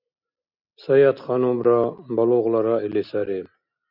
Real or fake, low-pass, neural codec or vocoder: real; 5.4 kHz; none